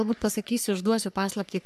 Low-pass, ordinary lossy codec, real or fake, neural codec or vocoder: 14.4 kHz; MP3, 96 kbps; fake; codec, 44.1 kHz, 3.4 kbps, Pupu-Codec